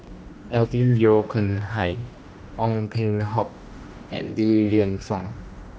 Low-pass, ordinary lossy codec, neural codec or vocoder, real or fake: none; none; codec, 16 kHz, 1 kbps, X-Codec, HuBERT features, trained on general audio; fake